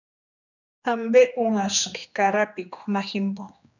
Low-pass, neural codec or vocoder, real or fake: 7.2 kHz; codec, 16 kHz, 2 kbps, X-Codec, HuBERT features, trained on general audio; fake